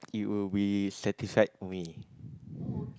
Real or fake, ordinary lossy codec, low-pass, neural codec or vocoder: real; none; none; none